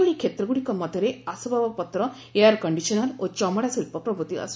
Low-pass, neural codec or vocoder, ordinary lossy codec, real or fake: none; none; none; real